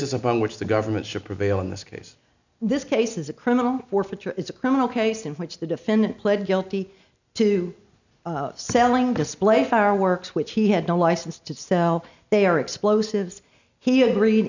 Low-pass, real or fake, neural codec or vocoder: 7.2 kHz; real; none